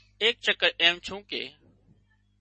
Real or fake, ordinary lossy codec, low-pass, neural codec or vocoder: real; MP3, 32 kbps; 10.8 kHz; none